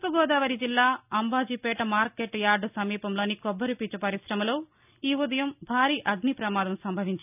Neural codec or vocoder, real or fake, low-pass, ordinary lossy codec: none; real; 3.6 kHz; none